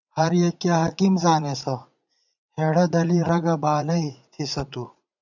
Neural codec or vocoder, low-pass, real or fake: vocoder, 44.1 kHz, 80 mel bands, Vocos; 7.2 kHz; fake